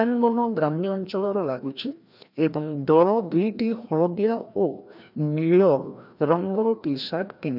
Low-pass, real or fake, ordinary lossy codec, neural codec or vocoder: 5.4 kHz; fake; none; codec, 16 kHz, 1 kbps, FreqCodec, larger model